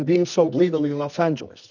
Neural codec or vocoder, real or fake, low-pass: codec, 24 kHz, 0.9 kbps, WavTokenizer, medium music audio release; fake; 7.2 kHz